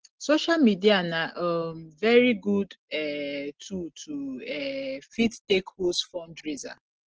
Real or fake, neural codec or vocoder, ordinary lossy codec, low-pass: real; none; Opus, 16 kbps; 7.2 kHz